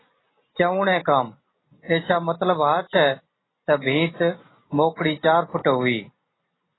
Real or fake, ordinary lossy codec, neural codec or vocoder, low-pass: real; AAC, 16 kbps; none; 7.2 kHz